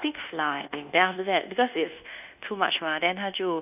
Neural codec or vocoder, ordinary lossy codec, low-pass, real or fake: codec, 24 kHz, 1.2 kbps, DualCodec; none; 3.6 kHz; fake